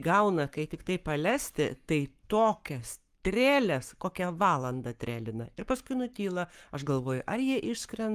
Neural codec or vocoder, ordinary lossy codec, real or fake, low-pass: codec, 44.1 kHz, 7.8 kbps, Pupu-Codec; Opus, 32 kbps; fake; 14.4 kHz